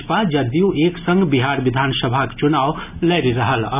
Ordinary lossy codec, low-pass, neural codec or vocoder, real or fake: none; 3.6 kHz; none; real